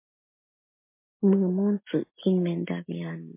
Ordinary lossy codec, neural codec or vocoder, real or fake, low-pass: MP3, 24 kbps; none; real; 3.6 kHz